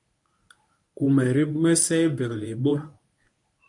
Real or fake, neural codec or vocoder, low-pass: fake; codec, 24 kHz, 0.9 kbps, WavTokenizer, medium speech release version 1; 10.8 kHz